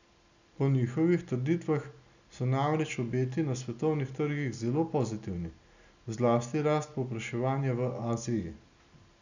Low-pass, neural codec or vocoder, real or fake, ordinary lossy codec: 7.2 kHz; none; real; none